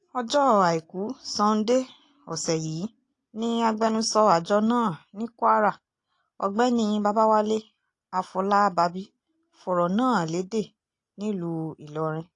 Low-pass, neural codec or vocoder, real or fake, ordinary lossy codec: 10.8 kHz; none; real; AAC, 48 kbps